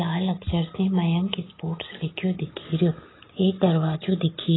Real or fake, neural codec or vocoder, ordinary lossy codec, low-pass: real; none; AAC, 16 kbps; 7.2 kHz